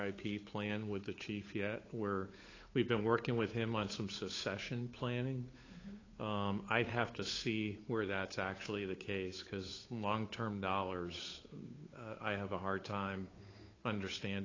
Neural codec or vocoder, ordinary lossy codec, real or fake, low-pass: codec, 16 kHz, 8 kbps, FunCodec, trained on Chinese and English, 25 frames a second; AAC, 32 kbps; fake; 7.2 kHz